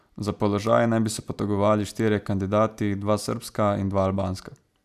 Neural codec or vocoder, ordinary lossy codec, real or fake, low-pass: none; none; real; 14.4 kHz